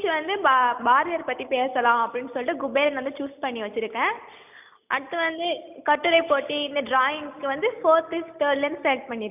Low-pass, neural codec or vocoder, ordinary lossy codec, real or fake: 3.6 kHz; none; none; real